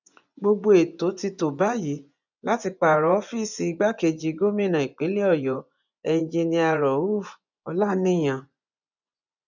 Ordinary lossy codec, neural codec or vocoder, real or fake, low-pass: none; vocoder, 24 kHz, 100 mel bands, Vocos; fake; 7.2 kHz